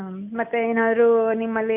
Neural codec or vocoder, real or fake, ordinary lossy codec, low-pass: none; real; none; 3.6 kHz